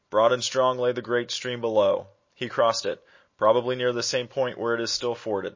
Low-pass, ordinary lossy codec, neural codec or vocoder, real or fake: 7.2 kHz; MP3, 32 kbps; none; real